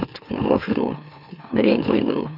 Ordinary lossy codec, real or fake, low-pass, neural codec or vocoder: none; fake; 5.4 kHz; autoencoder, 44.1 kHz, a latent of 192 numbers a frame, MeloTTS